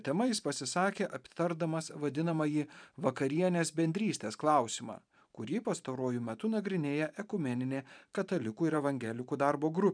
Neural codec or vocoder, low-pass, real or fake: vocoder, 44.1 kHz, 128 mel bands every 512 samples, BigVGAN v2; 9.9 kHz; fake